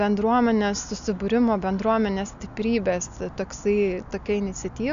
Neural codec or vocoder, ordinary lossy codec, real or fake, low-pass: none; AAC, 96 kbps; real; 7.2 kHz